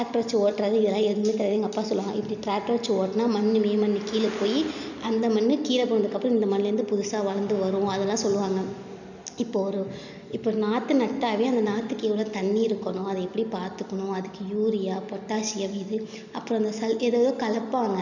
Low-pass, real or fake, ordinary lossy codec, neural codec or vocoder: 7.2 kHz; real; none; none